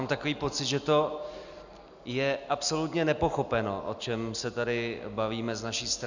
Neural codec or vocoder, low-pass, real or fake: none; 7.2 kHz; real